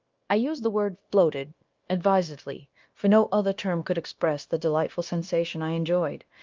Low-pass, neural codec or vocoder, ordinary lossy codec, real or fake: 7.2 kHz; codec, 16 kHz, 0.9 kbps, LongCat-Audio-Codec; Opus, 32 kbps; fake